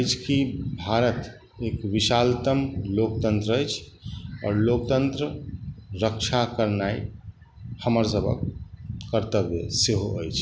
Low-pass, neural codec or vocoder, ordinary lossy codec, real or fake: none; none; none; real